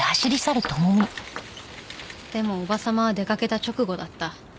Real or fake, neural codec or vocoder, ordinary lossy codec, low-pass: real; none; none; none